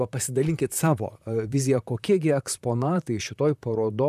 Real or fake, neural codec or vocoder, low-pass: fake; vocoder, 44.1 kHz, 128 mel bands, Pupu-Vocoder; 14.4 kHz